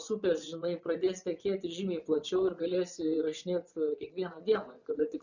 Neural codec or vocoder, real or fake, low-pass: vocoder, 24 kHz, 100 mel bands, Vocos; fake; 7.2 kHz